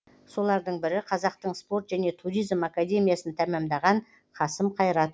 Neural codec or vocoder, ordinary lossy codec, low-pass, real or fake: none; none; none; real